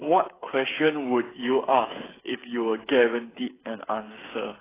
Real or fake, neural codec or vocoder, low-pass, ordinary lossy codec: fake; codec, 16 kHz, 8 kbps, FreqCodec, smaller model; 3.6 kHz; AAC, 16 kbps